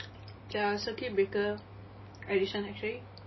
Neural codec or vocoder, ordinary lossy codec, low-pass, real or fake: none; MP3, 24 kbps; 7.2 kHz; real